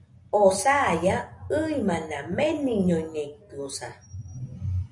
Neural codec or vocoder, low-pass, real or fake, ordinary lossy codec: none; 10.8 kHz; real; MP3, 64 kbps